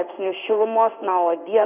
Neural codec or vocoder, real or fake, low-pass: codec, 16 kHz in and 24 kHz out, 1 kbps, XY-Tokenizer; fake; 3.6 kHz